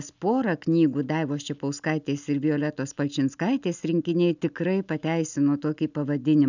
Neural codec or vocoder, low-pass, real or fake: none; 7.2 kHz; real